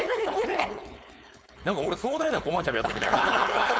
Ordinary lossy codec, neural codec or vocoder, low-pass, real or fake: none; codec, 16 kHz, 4.8 kbps, FACodec; none; fake